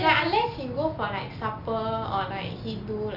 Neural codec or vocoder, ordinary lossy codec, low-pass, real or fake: none; none; 5.4 kHz; real